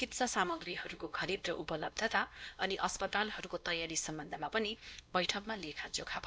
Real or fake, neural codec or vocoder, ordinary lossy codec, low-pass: fake; codec, 16 kHz, 1 kbps, X-Codec, WavLM features, trained on Multilingual LibriSpeech; none; none